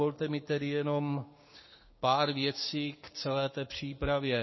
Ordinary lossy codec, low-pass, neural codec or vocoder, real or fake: MP3, 24 kbps; 7.2 kHz; codec, 16 kHz, 6 kbps, DAC; fake